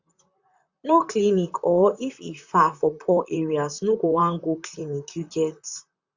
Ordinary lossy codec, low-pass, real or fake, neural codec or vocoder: Opus, 64 kbps; 7.2 kHz; fake; vocoder, 44.1 kHz, 128 mel bands, Pupu-Vocoder